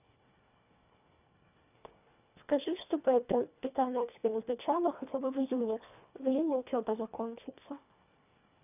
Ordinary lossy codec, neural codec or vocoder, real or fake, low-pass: none; codec, 24 kHz, 1.5 kbps, HILCodec; fake; 3.6 kHz